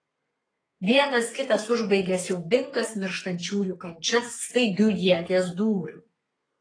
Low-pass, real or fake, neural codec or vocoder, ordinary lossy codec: 9.9 kHz; fake; codec, 32 kHz, 1.9 kbps, SNAC; AAC, 32 kbps